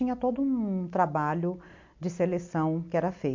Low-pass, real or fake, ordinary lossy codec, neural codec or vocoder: 7.2 kHz; real; MP3, 48 kbps; none